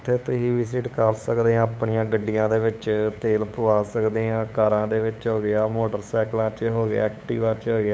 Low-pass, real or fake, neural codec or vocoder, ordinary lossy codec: none; fake; codec, 16 kHz, 8 kbps, FunCodec, trained on LibriTTS, 25 frames a second; none